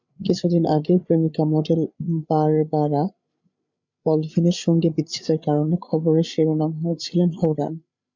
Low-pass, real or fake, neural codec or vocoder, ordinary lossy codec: 7.2 kHz; fake; codec, 16 kHz, 8 kbps, FreqCodec, larger model; MP3, 64 kbps